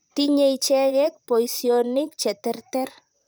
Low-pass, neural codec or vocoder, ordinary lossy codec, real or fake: none; vocoder, 44.1 kHz, 128 mel bands, Pupu-Vocoder; none; fake